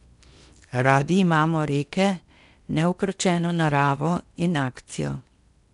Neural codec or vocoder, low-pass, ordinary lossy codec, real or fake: codec, 16 kHz in and 24 kHz out, 0.8 kbps, FocalCodec, streaming, 65536 codes; 10.8 kHz; none; fake